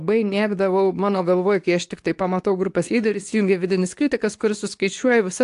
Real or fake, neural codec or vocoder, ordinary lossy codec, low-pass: fake; codec, 24 kHz, 0.9 kbps, WavTokenizer, medium speech release version 2; AAC, 64 kbps; 10.8 kHz